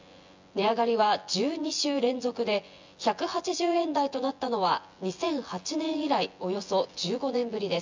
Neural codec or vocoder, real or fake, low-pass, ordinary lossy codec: vocoder, 24 kHz, 100 mel bands, Vocos; fake; 7.2 kHz; MP3, 64 kbps